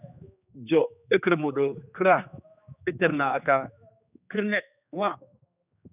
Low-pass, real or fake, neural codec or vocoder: 3.6 kHz; fake; codec, 16 kHz, 2 kbps, X-Codec, HuBERT features, trained on general audio